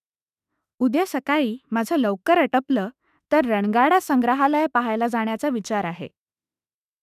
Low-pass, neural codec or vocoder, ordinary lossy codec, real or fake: 14.4 kHz; autoencoder, 48 kHz, 32 numbers a frame, DAC-VAE, trained on Japanese speech; none; fake